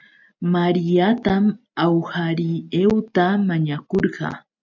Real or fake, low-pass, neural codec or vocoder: real; 7.2 kHz; none